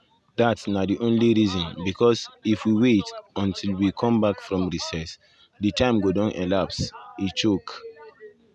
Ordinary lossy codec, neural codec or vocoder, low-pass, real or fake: none; none; none; real